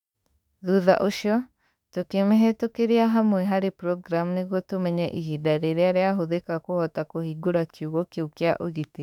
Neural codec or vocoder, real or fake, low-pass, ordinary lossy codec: autoencoder, 48 kHz, 32 numbers a frame, DAC-VAE, trained on Japanese speech; fake; 19.8 kHz; none